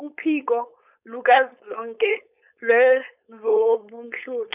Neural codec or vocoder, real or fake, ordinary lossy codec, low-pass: codec, 16 kHz, 4.8 kbps, FACodec; fake; none; 3.6 kHz